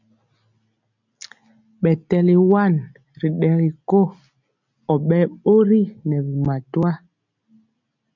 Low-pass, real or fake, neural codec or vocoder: 7.2 kHz; real; none